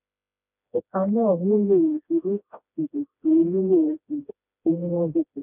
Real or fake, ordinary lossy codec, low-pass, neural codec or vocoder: fake; none; 3.6 kHz; codec, 16 kHz, 1 kbps, FreqCodec, smaller model